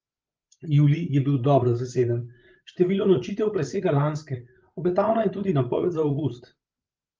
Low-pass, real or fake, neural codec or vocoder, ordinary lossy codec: 7.2 kHz; fake; codec, 16 kHz, 8 kbps, FreqCodec, larger model; Opus, 24 kbps